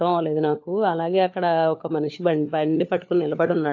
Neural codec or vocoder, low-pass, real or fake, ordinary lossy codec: codec, 16 kHz, 4 kbps, FunCodec, trained on LibriTTS, 50 frames a second; 7.2 kHz; fake; AAC, 48 kbps